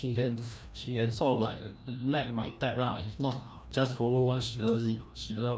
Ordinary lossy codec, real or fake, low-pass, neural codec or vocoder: none; fake; none; codec, 16 kHz, 1 kbps, FreqCodec, larger model